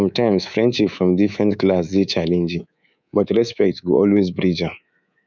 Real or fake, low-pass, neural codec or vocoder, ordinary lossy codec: fake; 7.2 kHz; codec, 24 kHz, 3.1 kbps, DualCodec; none